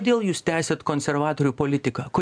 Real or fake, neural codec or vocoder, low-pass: real; none; 9.9 kHz